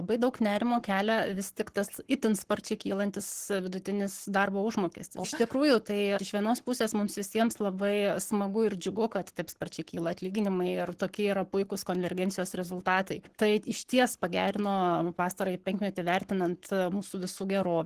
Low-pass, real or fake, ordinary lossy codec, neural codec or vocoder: 14.4 kHz; fake; Opus, 16 kbps; codec, 44.1 kHz, 7.8 kbps, Pupu-Codec